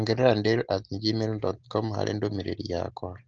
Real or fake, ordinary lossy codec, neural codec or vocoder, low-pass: real; Opus, 16 kbps; none; 7.2 kHz